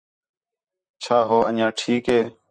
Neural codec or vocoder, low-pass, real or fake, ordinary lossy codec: vocoder, 44.1 kHz, 128 mel bands, Pupu-Vocoder; 9.9 kHz; fake; MP3, 48 kbps